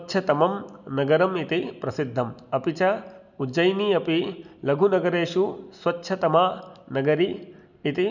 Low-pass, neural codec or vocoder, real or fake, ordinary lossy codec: 7.2 kHz; none; real; none